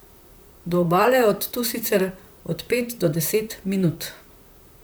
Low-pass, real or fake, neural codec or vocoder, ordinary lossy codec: none; fake; vocoder, 44.1 kHz, 128 mel bands, Pupu-Vocoder; none